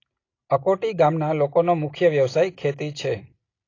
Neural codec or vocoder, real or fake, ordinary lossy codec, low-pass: none; real; AAC, 32 kbps; 7.2 kHz